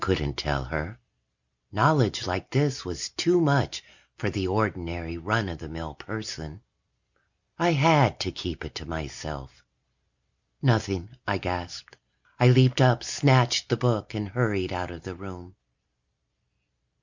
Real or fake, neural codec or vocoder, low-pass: real; none; 7.2 kHz